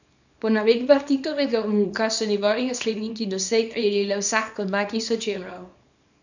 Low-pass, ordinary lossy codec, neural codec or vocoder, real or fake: 7.2 kHz; none; codec, 24 kHz, 0.9 kbps, WavTokenizer, small release; fake